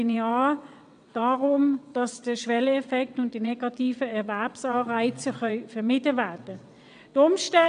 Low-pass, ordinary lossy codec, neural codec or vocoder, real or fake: 9.9 kHz; MP3, 96 kbps; vocoder, 22.05 kHz, 80 mel bands, WaveNeXt; fake